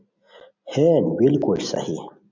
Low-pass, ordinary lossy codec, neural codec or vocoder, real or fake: 7.2 kHz; MP3, 64 kbps; none; real